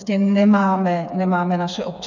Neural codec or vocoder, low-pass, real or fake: codec, 16 kHz, 4 kbps, FreqCodec, smaller model; 7.2 kHz; fake